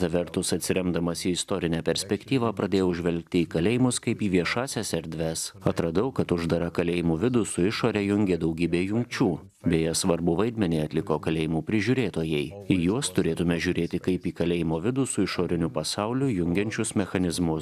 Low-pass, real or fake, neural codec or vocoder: 14.4 kHz; real; none